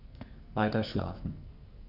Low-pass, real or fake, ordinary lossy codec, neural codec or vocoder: 5.4 kHz; fake; none; codec, 44.1 kHz, 2.6 kbps, SNAC